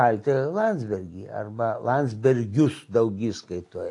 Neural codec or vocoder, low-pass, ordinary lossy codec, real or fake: none; 10.8 kHz; AAC, 48 kbps; real